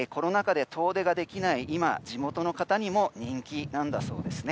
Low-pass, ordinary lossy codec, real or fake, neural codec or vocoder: none; none; real; none